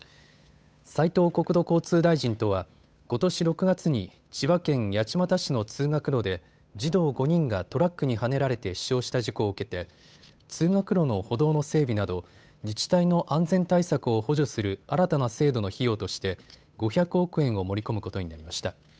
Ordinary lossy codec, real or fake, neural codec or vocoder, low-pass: none; fake; codec, 16 kHz, 8 kbps, FunCodec, trained on Chinese and English, 25 frames a second; none